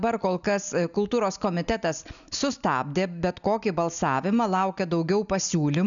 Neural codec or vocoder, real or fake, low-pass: none; real; 7.2 kHz